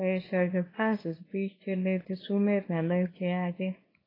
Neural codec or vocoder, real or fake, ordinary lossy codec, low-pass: codec, 24 kHz, 0.9 kbps, WavTokenizer, small release; fake; AAC, 24 kbps; 5.4 kHz